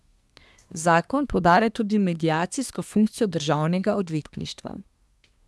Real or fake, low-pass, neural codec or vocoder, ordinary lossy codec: fake; none; codec, 24 kHz, 1 kbps, SNAC; none